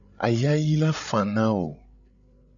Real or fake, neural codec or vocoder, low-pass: fake; codec, 16 kHz, 8 kbps, FreqCodec, larger model; 7.2 kHz